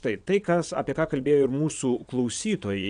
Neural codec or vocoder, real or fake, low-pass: vocoder, 22.05 kHz, 80 mel bands, WaveNeXt; fake; 9.9 kHz